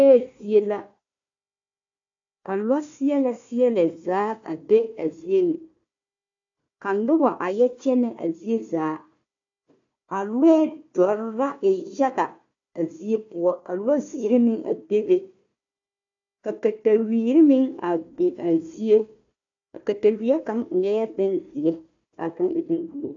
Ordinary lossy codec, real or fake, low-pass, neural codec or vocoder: AAC, 64 kbps; fake; 7.2 kHz; codec, 16 kHz, 1 kbps, FunCodec, trained on Chinese and English, 50 frames a second